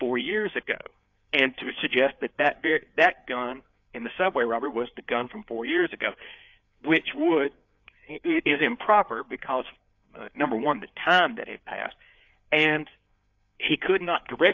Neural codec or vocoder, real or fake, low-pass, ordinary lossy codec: codec, 16 kHz, 4 kbps, FreqCodec, larger model; fake; 7.2 kHz; AAC, 48 kbps